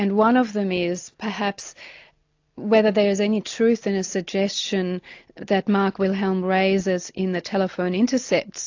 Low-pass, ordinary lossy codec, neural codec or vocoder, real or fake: 7.2 kHz; AAC, 48 kbps; none; real